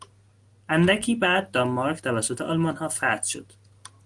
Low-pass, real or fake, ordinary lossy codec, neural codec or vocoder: 10.8 kHz; real; Opus, 24 kbps; none